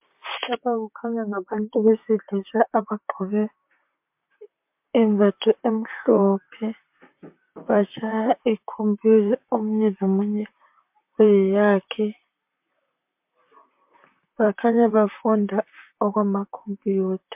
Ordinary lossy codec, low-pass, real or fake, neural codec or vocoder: MP3, 32 kbps; 3.6 kHz; fake; vocoder, 44.1 kHz, 128 mel bands, Pupu-Vocoder